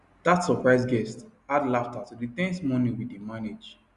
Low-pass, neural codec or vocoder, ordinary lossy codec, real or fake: 10.8 kHz; none; Opus, 64 kbps; real